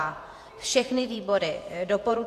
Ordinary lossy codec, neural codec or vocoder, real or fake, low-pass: AAC, 64 kbps; vocoder, 48 kHz, 128 mel bands, Vocos; fake; 14.4 kHz